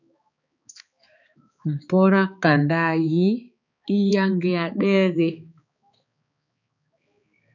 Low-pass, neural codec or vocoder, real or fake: 7.2 kHz; codec, 16 kHz, 4 kbps, X-Codec, HuBERT features, trained on balanced general audio; fake